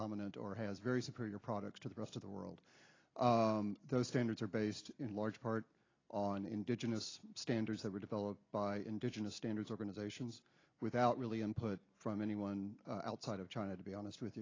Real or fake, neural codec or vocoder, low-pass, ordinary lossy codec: real; none; 7.2 kHz; AAC, 32 kbps